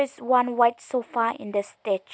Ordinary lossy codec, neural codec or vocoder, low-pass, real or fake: none; none; none; real